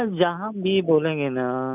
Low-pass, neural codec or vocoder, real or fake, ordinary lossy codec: 3.6 kHz; none; real; none